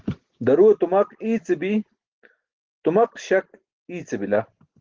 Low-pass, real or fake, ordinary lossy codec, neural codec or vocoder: 7.2 kHz; real; Opus, 16 kbps; none